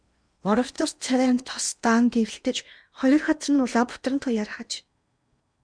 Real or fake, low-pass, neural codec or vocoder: fake; 9.9 kHz; codec, 16 kHz in and 24 kHz out, 0.8 kbps, FocalCodec, streaming, 65536 codes